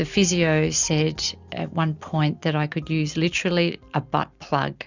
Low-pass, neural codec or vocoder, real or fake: 7.2 kHz; none; real